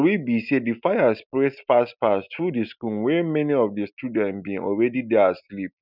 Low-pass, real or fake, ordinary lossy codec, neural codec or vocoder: 5.4 kHz; real; none; none